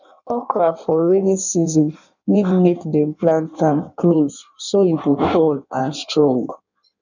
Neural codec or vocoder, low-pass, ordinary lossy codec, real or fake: codec, 16 kHz in and 24 kHz out, 1.1 kbps, FireRedTTS-2 codec; 7.2 kHz; none; fake